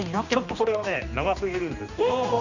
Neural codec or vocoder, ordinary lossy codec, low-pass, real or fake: codec, 16 kHz, 2 kbps, X-Codec, HuBERT features, trained on general audio; none; 7.2 kHz; fake